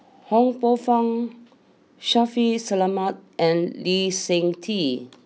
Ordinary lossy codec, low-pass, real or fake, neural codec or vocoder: none; none; real; none